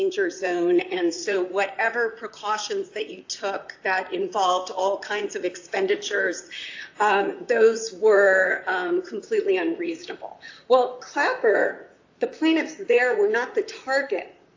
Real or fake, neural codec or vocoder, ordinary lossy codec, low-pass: fake; vocoder, 44.1 kHz, 128 mel bands, Pupu-Vocoder; AAC, 48 kbps; 7.2 kHz